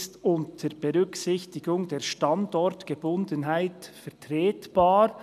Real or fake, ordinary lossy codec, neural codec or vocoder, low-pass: real; none; none; 14.4 kHz